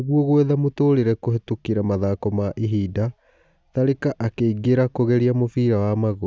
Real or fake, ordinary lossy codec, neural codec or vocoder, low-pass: real; none; none; none